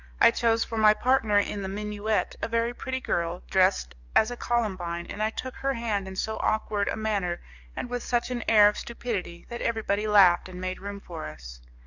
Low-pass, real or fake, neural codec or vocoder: 7.2 kHz; fake; codec, 44.1 kHz, 7.8 kbps, DAC